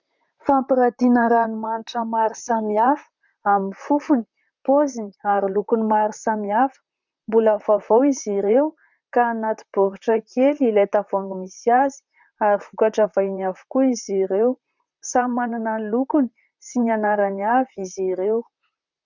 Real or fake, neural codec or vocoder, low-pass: fake; vocoder, 44.1 kHz, 128 mel bands, Pupu-Vocoder; 7.2 kHz